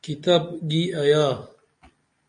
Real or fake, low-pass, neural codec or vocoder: real; 9.9 kHz; none